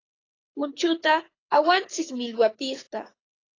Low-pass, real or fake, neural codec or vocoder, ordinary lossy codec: 7.2 kHz; fake; codec, 24 kHz, 6 kbps, HILCodec; AAC, 32 kbps